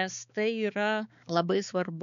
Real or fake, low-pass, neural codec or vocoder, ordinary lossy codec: fake; 7.2 kHz; codec, 16 kHz, 4 kbps, X-Codec, HuBERT features, trained on balanced general audio; MP3, 96 kbps